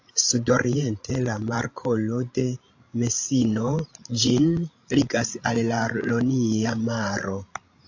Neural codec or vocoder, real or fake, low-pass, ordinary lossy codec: codec, 16 kHz, 16 kbps, FreqCodec, larger model; fake; 7.2 kHz; AAC, 48 kbps